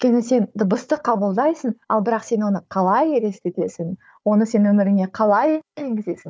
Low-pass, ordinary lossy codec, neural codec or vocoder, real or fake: none; none; codec, 16 kHz, 4 kbps, FunCodec, trained on Chinese and English, 50 frames a second; fake